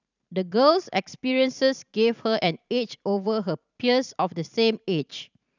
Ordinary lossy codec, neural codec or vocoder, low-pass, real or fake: none; vocoder, 44.1 kHz, 128 mel bands every 512 samples, BigVGAN v2; 7.2 kHz; fake